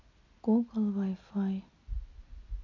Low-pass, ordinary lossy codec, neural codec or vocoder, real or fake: 7.2 kHz; none; none; real